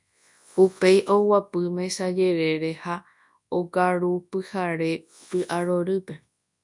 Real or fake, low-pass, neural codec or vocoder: fake; 10.8 kHz; codec, 24 kHz, 0.9 kbps, WavTokenizer, large speech release